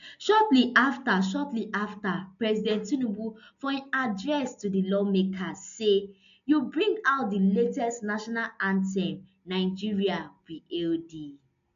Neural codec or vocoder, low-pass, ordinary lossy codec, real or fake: none; 7.2 kHz; none; real